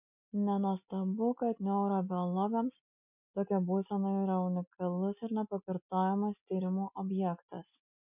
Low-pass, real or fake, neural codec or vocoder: 3.6 kHz; real; none